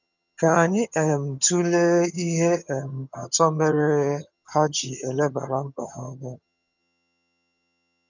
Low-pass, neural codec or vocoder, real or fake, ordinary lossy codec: 7.2 kHz; vocoder, 22.05 kHz, 80 mel bands, HiFi-GAN; fake; none